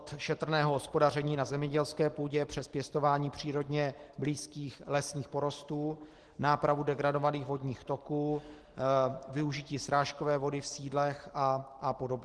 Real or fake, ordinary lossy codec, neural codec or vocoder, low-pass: real; Opus, 16 kbps; none; 10.8 kHz